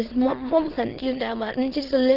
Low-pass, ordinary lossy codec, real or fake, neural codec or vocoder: 5.4 kHz; Opus, 16 kbps; fake; autoencoder, 22.05 kHz, a latent of 192 numbers a frame, VITS, trained on many speakers